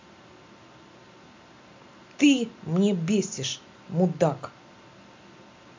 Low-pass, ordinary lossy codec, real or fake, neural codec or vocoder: 7.2 kHz; MP3, 64 kbps; real; none